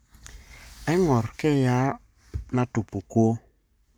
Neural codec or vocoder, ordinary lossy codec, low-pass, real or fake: codec, 44.1 kHz, 7.8 kbps, Pupu-Codec; none; none; fake